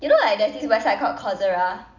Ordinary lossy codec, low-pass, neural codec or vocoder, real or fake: none; 7.2 kHz; none; real